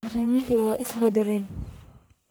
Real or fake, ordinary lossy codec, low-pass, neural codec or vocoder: fake; none; none; codec, 44.1 kHz, 1.7 kbps, Pupu-Codec